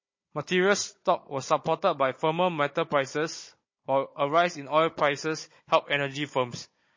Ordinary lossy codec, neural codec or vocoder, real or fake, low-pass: MP3, 32 kbps; codec, 16 kHz, 16 kbps, FunCodec, trained on Chinese and English, 50 frames a second; fake; 7.2 kHz